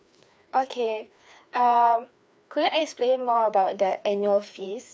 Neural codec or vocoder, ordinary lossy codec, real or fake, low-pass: codec, 16 kHz, 2 kbps, FreqCodec, larger model; none; fake; none